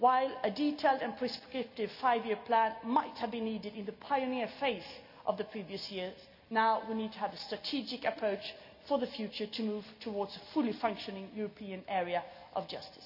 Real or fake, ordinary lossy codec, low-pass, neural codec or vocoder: real; none; 5.4 kHz; none